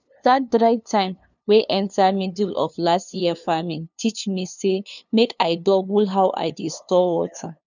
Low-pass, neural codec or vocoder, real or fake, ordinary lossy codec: 7.2 kHz; codec, 16 kHz, 2 kbps, FunCodec, trained on LibriTTS, 25 frames a second; fake; none